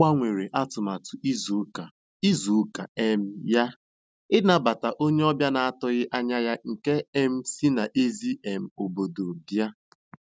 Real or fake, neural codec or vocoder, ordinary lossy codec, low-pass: real; none; none; none